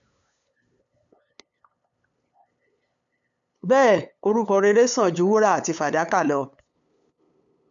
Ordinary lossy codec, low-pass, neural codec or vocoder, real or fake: none; 7.2 kHz; codec, 16 kHz, 8 kbps, FunCodec, trained on LibriTTS, 25 frames a second; fake